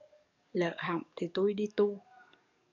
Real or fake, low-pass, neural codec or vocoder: fake; 7.2 kHz; codec, 44.1 kHz, 7.8 kbps, DAC